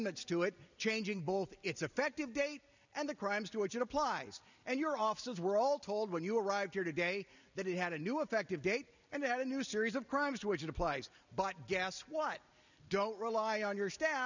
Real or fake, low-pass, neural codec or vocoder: real; 7.2 kHz; none